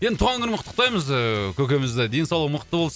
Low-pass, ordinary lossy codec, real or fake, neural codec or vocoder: none; none; real; none